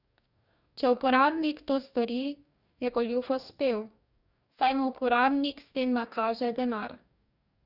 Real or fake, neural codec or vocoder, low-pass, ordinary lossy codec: fake; codec, 44.1 kHz, 2.6 kbps, DAC; 5.4 kHz; none